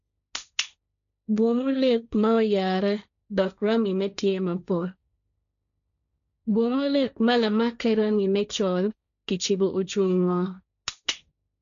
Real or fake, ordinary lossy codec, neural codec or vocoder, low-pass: fake; none; codec, 16 kHz, 1.1 kbps, Voila-Tokenizer; 7.2 kHz